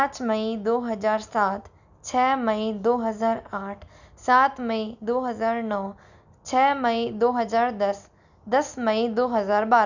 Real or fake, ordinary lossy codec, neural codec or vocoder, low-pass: real; none; none; 7.2 kHz